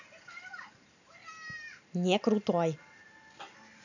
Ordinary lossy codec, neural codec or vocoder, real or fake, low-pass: none; none; real; 7.2 kHz